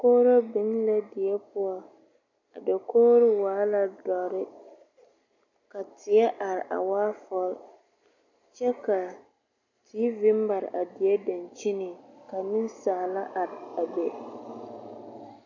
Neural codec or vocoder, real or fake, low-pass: none; real; 7.2 kHz